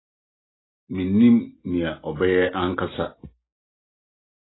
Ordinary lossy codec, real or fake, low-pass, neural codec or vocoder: AAC, 16 kbps; real; 7.2 kHz; none